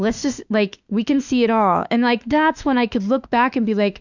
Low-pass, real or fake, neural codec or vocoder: 7.2 kHz; fake; autoencoder, 48 kHz, 32 numbers a frame, DAC-VAE, trained on Japanese speech